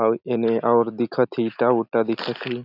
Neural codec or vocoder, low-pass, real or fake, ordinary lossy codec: none; 5.4 kHz; real; none